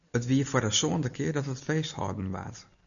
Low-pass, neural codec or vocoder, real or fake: 7.2 kHz; none; real